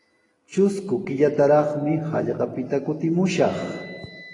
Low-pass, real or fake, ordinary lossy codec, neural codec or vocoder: 10.8 kHz; real; AAC, 32 kbps; none